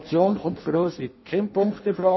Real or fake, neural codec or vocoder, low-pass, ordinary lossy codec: fake; codec, 16 kHz in and 24 kHz out, 0.6 kbps, FireRedTTS-2 codec; 7.2 kHz; MP3, 24 kbps